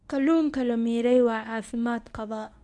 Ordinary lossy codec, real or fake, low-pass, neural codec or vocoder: none; fake; none; codec, 24 kHz, 0.9 kbps, WavTokenizer, medium speech release version 1